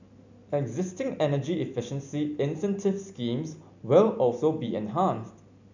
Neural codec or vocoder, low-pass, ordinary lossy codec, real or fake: none; 7.2 kHz; none; real